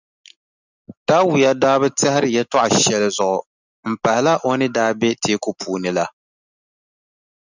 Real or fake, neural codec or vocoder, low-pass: real; none; 7.2 kHz